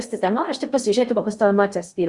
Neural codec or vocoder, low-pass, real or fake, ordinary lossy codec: codec, 16 kHz in and 24 kHz out, 0.6 kbps, FocalCodec, streaming, 4096 codes; 10.8 kHz; fake; Opus, 64 kbps